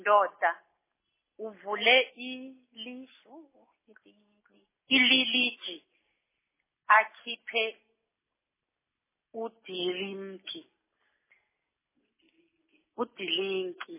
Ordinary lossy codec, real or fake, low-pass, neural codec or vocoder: MP3, 16 kbps; real; 3.6 kHz; none